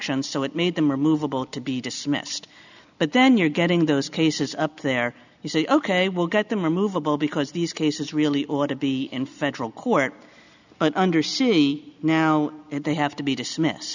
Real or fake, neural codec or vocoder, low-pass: real; none; 7.2 kHz